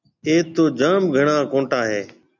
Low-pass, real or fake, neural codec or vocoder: 7.2 kHz; real; none